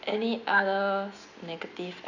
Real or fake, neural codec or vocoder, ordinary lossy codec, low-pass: real; none; none; 7.2 kHz